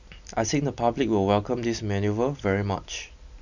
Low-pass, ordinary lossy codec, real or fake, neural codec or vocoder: 7.2 kHz; none; real; none